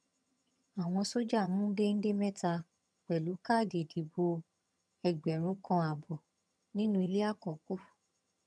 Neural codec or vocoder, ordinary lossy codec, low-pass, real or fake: vocoder, 22.05 kHz, 80 mel bands, HiFi-GAN; none; none; fake